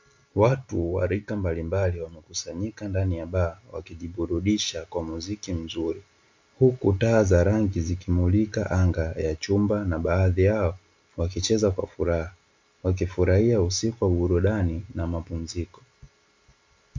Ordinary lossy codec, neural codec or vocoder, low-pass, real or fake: MP3, 64 kbps; none; 7.2 kHz; real